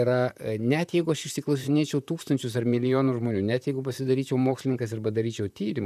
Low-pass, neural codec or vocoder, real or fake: 14.4 kHz; vocoder, 44.1 kHz, 128 mel bands, Pupu-Vocoder; fake